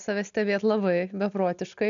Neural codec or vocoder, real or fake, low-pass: none; real; 7.2 kHz